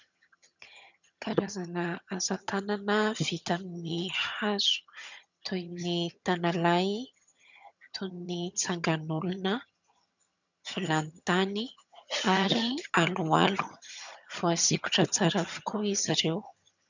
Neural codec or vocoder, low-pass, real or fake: vocoder, 22.05 kHz, 80 mel bands, HiFi-GAN; 7.2 kHz; fake